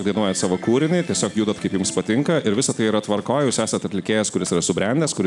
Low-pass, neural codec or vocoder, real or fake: 10.8 kHz; none; real